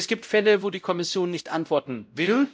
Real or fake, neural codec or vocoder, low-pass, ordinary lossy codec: fake; codec, 16 kHz, 0.5 kbps, X-Codec, WavLM features, trained on Multilingual LibriSpeech; none; none